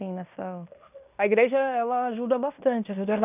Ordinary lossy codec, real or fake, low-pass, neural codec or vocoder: none; fake; 3.6 kHz; codec, 16 kHz in and 24 kHz out, 0.9 kbps, LongCat-Audio-Codec, fine tuned four codebook decoder